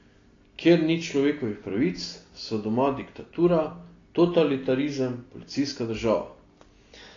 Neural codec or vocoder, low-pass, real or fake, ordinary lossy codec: none; 7.2 kHz; real; AAC, 32 kbps